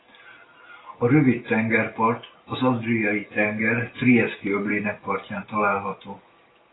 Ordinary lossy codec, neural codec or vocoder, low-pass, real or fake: AAC, 16 kbps; none; 7.2 kHz; real